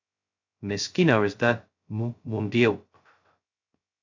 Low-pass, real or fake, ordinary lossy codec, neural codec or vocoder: 7.2 kHz; fake; AAC, 48 kbps; codec, 16 kHz, 0.2 kbps, FocalCodec